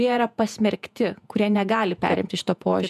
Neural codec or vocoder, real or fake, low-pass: vocoder, 48 kHz, 128 mel bands, Vocos; fake; 14.4 kHz